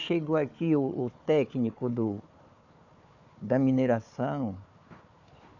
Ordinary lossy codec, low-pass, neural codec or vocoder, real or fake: none; 7.2 kHz; codec, 16 kHz, 4 kbps, FunCodec, trained on Chinese and English, 50 frames a second; fake